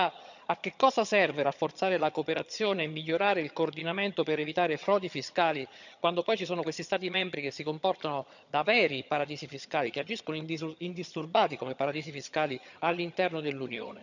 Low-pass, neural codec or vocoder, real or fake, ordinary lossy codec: 7.2 kHz; vocoder, 22.05 kHz, 80 mel bands, HiFi-GAN; fake; none